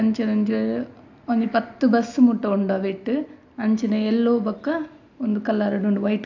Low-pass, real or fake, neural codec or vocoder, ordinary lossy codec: 7.2 kHz; real; none; AAC, 48 kbps